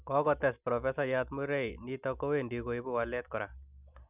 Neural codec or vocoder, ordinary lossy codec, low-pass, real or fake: none; none; 3.6 kHz; real